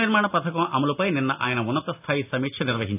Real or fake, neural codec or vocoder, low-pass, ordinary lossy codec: real; none; 3.6 kHz; AAC, 24 kbps